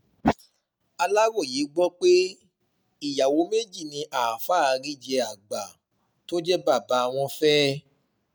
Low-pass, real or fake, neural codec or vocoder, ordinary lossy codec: none; real; none; none